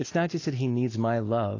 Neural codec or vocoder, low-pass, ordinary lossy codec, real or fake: none; 7.2 kHz; AAC, 32 kbps; real